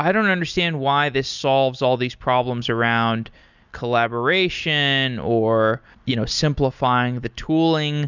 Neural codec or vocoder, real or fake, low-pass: none; real; 7.2 kHz